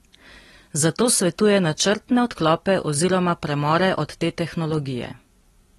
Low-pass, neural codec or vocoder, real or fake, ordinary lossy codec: 19.8 kHz; none; real; AAC, 32 kbps